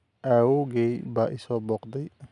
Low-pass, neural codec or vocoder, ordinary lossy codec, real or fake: 10.8 kHz; none; none; real